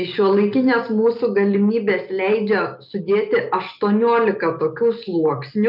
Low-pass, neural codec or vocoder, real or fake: 5.4 kHz; none; real